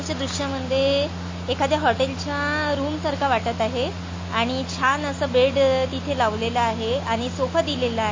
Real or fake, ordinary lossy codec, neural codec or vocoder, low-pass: real; MP3, 32 kbps; none; 7.2 kHz